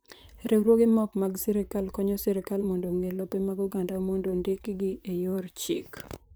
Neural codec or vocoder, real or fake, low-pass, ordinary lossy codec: vocoder, 44.1 kHz, 128 mel bands, Pupu-Vocoder; fake; none; none